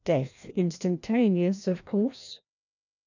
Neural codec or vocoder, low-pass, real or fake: codec, 16 kHz, 1 kbps, FreqCodec, larger model; 7.2 kHz; fake